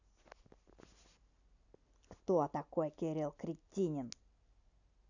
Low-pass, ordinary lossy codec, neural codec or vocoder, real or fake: 7.2 kHz; none; none; real